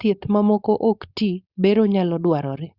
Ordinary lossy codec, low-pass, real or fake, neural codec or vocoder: Opus, 64 kbps; 5.4 kHz; fake; codec, 16 kHz, 4.8 kbps, FACodec